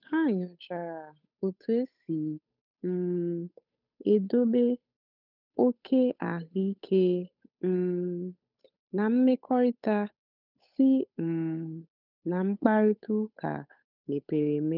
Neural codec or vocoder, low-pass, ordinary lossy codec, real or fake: codec, 16 kHz, 8 kbps, FunCodec, trained on Chinese and English, 25 frames a second; 5.4 kHz; none; fake